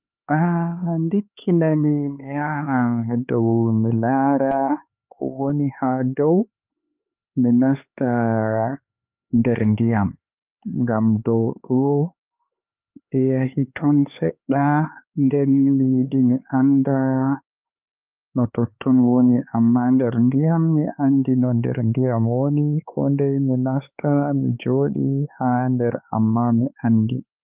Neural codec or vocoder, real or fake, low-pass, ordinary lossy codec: codec, 16 kHz, 4 kbps, X-Codec, HuBERT features, trained on LibriSpeech; fake; 3.6 kHz; Opus, 24 kbps